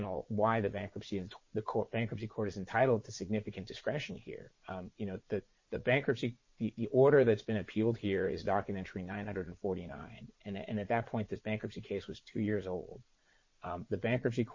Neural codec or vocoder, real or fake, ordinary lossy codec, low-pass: vocoder, 22.05 kHz, 80 mel bands, Vocos; fake; MP3, 32 kbps; 7.2 kHz